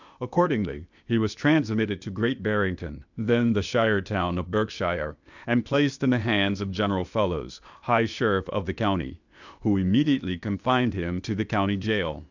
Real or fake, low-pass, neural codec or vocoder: fake; 7.2 kHz; codec, 16 kHz, 0.8 kbps, ZipCodec